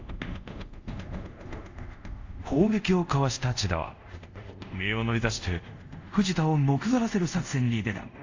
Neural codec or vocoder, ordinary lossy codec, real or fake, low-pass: codec, 24 kHz, 0.5 kbps, DualCodec; AAC, 48 kbps; fake; 7.2 kHz